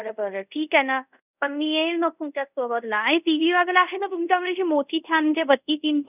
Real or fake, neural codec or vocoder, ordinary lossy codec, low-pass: fake; codec, 24 kHz, 0.5 kbps, DualCodec; none; 3.6 kHz